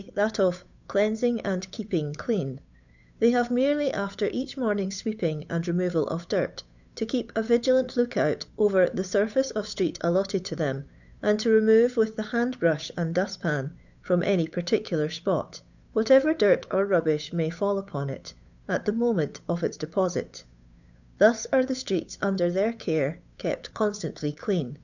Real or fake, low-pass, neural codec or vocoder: fake; 7.2 kHz; codec, 16 kHz, 8 kbps, FunCodec, trained on Chinese and English, 25 frames a second